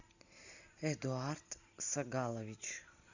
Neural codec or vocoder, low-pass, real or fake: none; 7.2 kHz; real